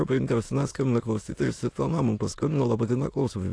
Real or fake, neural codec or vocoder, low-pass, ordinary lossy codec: fake; autoencoder, 22.05 kHz, a latent of 192 numbers a frame, VITS, trained on many speakers; 9.9 kHz; AAC, 48 kbps